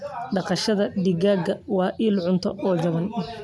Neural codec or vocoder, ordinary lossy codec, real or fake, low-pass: none; none; real; none